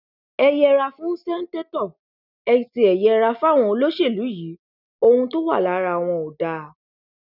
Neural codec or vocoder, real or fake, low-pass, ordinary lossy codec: none; real; 5.4 kHz; none